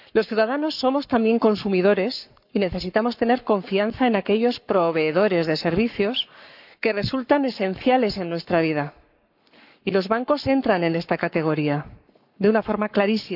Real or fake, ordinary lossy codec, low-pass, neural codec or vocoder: fake; none; 5.4 kHz; codec, 44.1 kHz, 7.8 kbps, Pupu-Codec